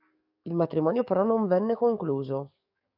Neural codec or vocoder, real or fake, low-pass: codec, 44.1 kHz, 7.8 kbps, DAC; fake; 5.4 kHz